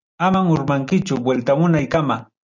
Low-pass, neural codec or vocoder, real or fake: 7.2 kHz; none; real